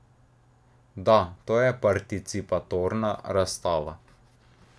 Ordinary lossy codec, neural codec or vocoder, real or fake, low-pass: none; none; real; none